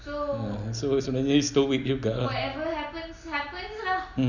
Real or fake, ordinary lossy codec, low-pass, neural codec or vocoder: real; none; 7.2 kHz; none